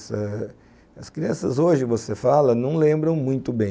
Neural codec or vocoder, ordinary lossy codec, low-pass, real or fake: none; none; none; real